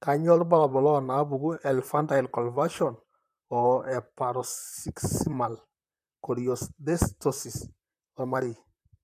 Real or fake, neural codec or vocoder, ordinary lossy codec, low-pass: fake; vocoder, 44.1 kHz, 128 mel bands, Pupu-Vocoder; none; 14.4 kHz